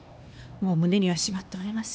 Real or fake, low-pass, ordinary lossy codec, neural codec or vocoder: fake; none; none; codec, 16 kHz, 2 kbps, X-Codec, HuBERT features, trained on LibriSpeech